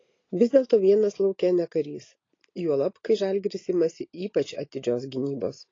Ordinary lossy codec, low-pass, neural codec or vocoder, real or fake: AAC, 32 kbps; 7.2 kHz; none; real